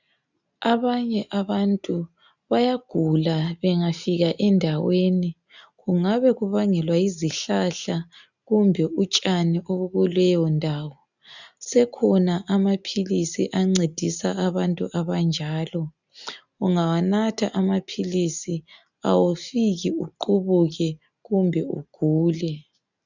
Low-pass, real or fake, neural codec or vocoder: 7.2 kHz; real; none